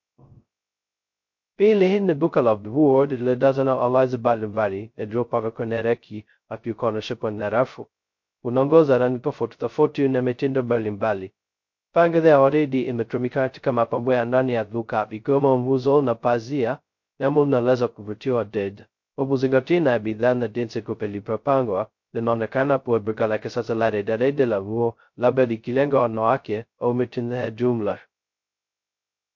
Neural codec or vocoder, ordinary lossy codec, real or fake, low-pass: codec, 16 kHz, 0.2 kbps, FocalCodec; MP3, 48 kbps; fake; 7.2 kHz